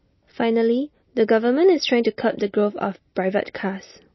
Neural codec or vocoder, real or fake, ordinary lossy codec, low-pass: none; real; MP3, 24 kbps; 7.2 kHz